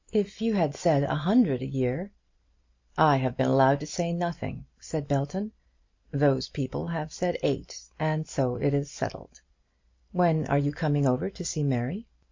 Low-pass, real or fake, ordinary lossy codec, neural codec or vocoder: 7.2 kHz; real; MP3, 48 kbps; none